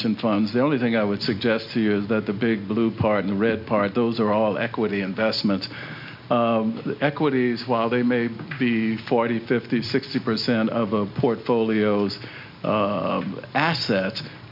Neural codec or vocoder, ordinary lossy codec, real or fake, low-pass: none; MP3, 48 kbps; real; 5.4 kHz